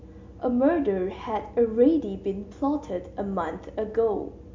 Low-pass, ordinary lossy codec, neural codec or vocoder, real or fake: 7.2 kHz; MP3, 48 kbps; none; real